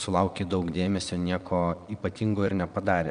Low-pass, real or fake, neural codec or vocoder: 9.9 kHz; fake; vocoder, 22.05 kHz, 80 mel bands, Vocos